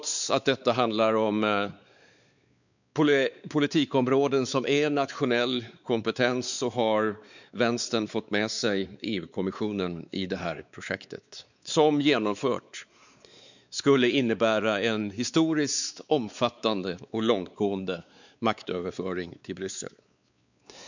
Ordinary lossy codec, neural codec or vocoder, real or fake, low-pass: none; codec, 16 kHz, 4 kbps, X-Codec, WavLM features, trained on Multilingual LibriSpeech; fake; 7.2 kHz